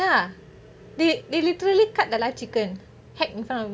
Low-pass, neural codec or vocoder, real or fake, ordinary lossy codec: none; none; real; none